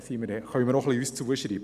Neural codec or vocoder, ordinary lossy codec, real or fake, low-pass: none; none; real; 14.4 kHz